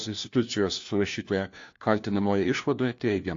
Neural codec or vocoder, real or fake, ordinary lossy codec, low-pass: codec, 16 kHz, 1 kbps, FunCodec, trained on LibriTTS, 50 frames a second; fake; AAC, 48 kbps; 7.2 kHz